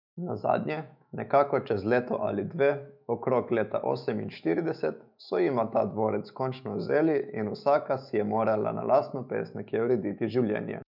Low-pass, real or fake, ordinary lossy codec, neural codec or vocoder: 5.4 kHz; fake; none; autoencoder, 48 kHz, 128 numbers a frame, DAC-VAE, trained on Japanese speech